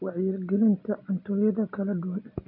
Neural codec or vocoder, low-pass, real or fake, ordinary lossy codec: none; 5.4 kHz; real; none